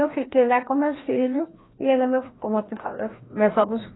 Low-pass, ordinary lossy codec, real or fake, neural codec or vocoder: 7.2 kHz; AAC, 16 kbps; fake; codec, 16 kHz, 1 kbps, FreqCodec, larger model